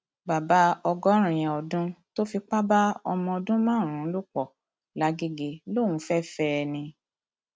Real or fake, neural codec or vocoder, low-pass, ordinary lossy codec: real; none; none; none